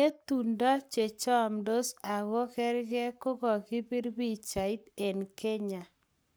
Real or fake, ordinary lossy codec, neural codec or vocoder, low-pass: fake; none; codec, 44.1 kHz, 7.8 kbps, Pupu-Codec; none